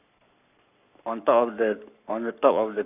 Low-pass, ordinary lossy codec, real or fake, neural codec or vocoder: 3.6 kHz; none; fake; codec, 44.1 kHz, 7.8 kbps, Pupu-Codec